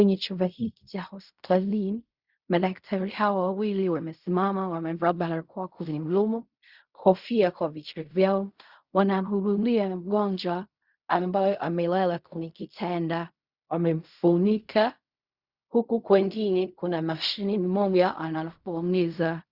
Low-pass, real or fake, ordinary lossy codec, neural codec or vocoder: 5.4 kHz; fake; Opus, 64 kbps; codec, 16 kHz in and 24 kHz out, 0.4 kbps, LongCat-Audio-Codec, fine tuned four codebook decoder